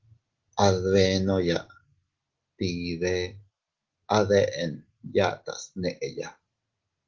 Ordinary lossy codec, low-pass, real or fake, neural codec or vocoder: Opus, 16 kbps; 7.2 kHz; real; none